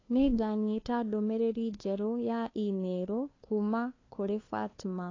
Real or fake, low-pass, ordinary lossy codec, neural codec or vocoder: fake; 7.2 kHz; AAC, 32 kbps; codec, 16 kHz, 2 kbps, FunCodec, trained on LibriTTS, 25 frames a second